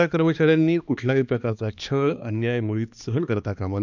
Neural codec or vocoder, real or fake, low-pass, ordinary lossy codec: codec, 16 kHz, 2 kbps, X-Codec, HuBERT features, trained on balanced general audio; fake; 7.2 kHz; none